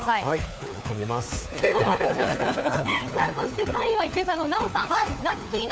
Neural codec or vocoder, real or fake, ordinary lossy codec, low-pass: codec, 16 kHz, 4 kbps, FunCodec, trained on LibriTTS, 50 frames a second; fake; none; none